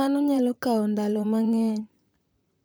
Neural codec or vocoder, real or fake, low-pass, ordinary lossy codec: vocoder, 44.1 kHz, 128 mel bands, Pupu-Vocoder; fake; none; none